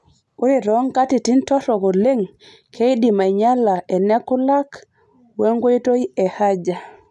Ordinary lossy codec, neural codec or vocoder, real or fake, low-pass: none; none; real; none